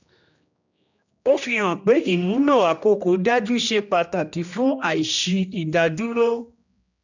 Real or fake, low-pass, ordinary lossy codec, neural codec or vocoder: fake; 7.2 kHz; none; codec, 16 kHz, 1 kbps, X-Codec, HuBERT features, trained on general audio